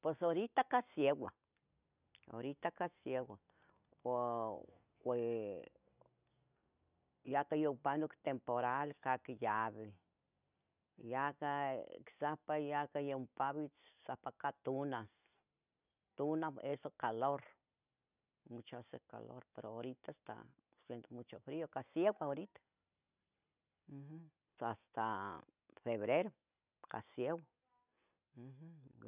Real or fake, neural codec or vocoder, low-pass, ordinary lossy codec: real; none; 3.6 kHz; none